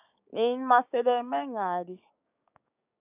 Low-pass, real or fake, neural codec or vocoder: 3.6 kHz; fake; codec, 16 kHz, 6 kbps, DAC